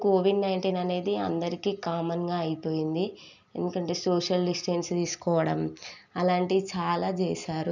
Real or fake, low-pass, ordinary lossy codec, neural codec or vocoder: real; 7.2 kHz; none; none